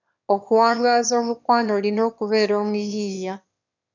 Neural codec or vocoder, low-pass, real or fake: autoencoder, 22.05 kHz, a latent of 192 numbers a frame, VITS, trained on one speaker; 7.2 kHz; fake